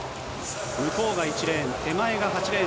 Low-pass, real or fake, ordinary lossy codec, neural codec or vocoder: none; real; none; none